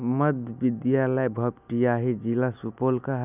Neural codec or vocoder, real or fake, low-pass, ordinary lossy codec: none; real; 3.6 kHz; none